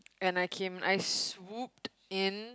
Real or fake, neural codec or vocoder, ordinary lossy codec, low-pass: real; none; none; none